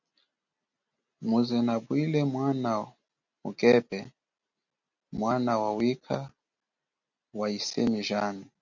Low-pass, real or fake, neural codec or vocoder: 7.2 kHz; real; none